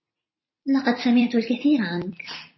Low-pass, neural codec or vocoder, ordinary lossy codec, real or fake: 7.2 kHz; vocoder, 44.1 kHz, 128 mel bands every 256 samples, BigVGAN v2; MP3, 24 kbps; fake